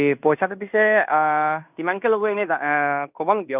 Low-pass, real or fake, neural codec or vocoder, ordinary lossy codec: 3.6 kHz; fake; codec, 16 kHz in and 24 kHz out, 0.9 kbps, LongCat-Audio-Codec, fine tuned four codebook decoder; none